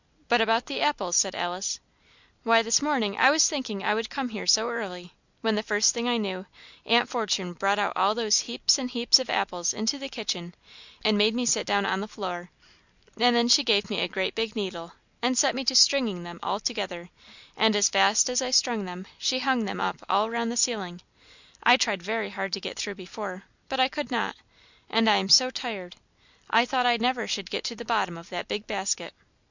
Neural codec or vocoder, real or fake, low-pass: none; real; 7.2 kHz